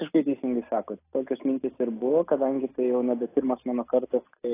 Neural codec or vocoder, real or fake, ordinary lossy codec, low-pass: none; real; AAC, 16 kbps; 3.6 kHz